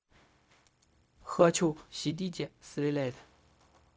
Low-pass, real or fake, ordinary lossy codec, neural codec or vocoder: none; fake; none; codec, 16 kHz, 0.4 kbps, LongCat-Audio-Codec